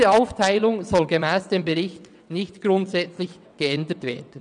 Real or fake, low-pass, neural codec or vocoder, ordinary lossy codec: fake; 9.9 kHz; vocoder, 22.05 kHz, 80 mel bands, WaveNeXt; none